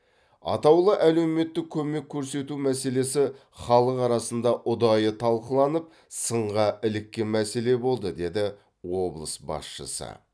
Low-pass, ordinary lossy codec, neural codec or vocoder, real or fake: none; none; none; real